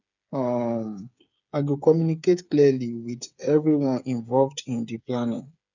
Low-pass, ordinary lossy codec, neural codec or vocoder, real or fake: 7.2 kHz; none; codec, 16 kHz, 8 kbps, FreqCodec, smaller model; fake